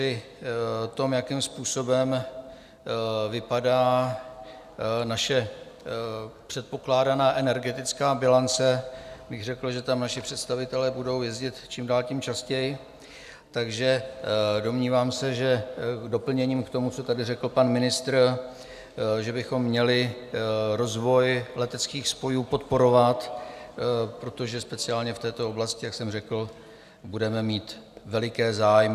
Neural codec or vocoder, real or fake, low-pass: none; real; 14.4 kHz